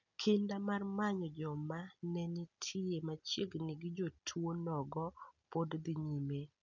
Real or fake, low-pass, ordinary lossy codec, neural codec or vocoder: real; 7.2 kHz; none; none